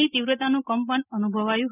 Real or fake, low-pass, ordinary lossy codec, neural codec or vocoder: real; 3.6 kHz; none; none